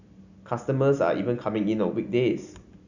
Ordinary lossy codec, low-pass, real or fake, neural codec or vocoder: none; 7.2 kHz; real; none